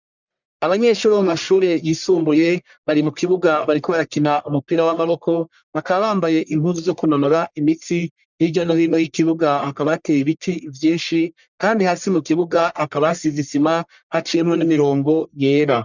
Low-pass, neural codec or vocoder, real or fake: 7.2 kHz; codec, 44.1 kHz, 1.7 kbps, Pupu-Codec; fake